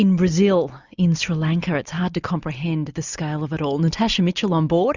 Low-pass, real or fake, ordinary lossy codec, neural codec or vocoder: 7.2 kHz; real; Opus, 64 kbps; none